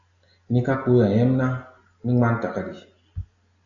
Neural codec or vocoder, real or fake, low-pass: none; real; 7.2 kHz